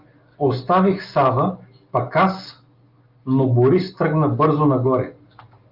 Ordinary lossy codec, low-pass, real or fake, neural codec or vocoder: Opus, 24 kbps; 5.4 kHz; real; none